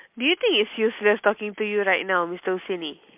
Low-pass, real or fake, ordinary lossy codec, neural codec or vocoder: 3.6 kHz; real; MP3, 32 kbps; none